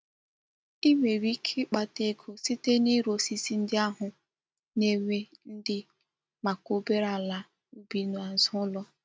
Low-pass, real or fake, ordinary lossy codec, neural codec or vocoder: none; real; none; none